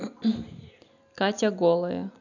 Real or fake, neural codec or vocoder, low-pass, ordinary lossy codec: real; none; 7.2 kHz; none